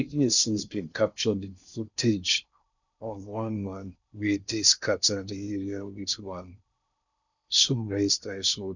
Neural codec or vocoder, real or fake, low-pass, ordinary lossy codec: codec, 16 kHz in and 24 kHz out, 0.6 kbps, FocalCodec, streaming, 4096 codes; fake; 7.2 kHz; none